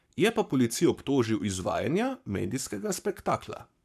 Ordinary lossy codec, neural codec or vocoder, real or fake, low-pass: none; codec, 44.1 kHz, 7.8 kbps, Pupu-Codec; fake; 14.4 kHz